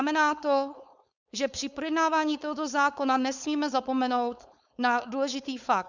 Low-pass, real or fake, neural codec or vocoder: 7.2 kHz; fake; codec, 16 kHz, 4.8 kbps, FACodec